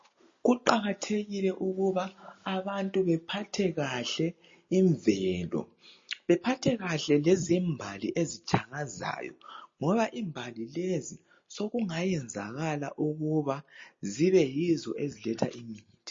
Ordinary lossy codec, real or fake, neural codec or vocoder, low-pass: MP3, 32 kbps; real; none; 7.2 kHz